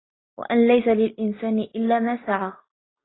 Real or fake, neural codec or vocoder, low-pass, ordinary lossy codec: real; none; 7.2 kHz; AAC, 16 kbps